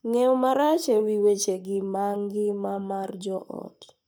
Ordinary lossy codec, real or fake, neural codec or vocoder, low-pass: none; fake; codec, 44.1 kHz, 7.8 kbps, Pupu-Codec; none